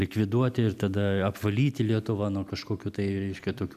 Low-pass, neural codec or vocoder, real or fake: 14.4 kHz; none; real